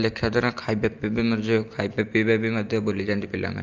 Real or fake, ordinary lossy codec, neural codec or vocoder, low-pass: real; Opus, 24 kbps; none; 7.2 kHz